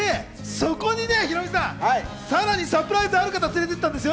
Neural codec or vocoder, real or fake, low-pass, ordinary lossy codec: none; real; none; none